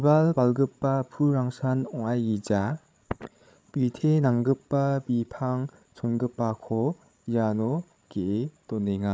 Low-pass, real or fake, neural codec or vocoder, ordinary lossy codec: none; fake; codec, 16 kHz, 16 kbps, FreqCodec, larger model; none